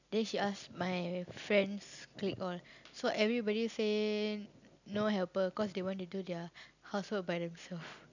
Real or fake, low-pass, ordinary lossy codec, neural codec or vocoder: real; 7.2 kHz; none; none